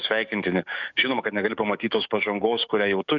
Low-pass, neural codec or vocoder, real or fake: 7.2 kHz; codec, 44.1 kHz, 7.8 kbps, DAC; fake